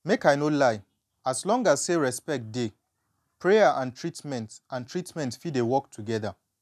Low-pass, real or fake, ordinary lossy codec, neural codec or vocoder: 14.4 kHz; real; none; none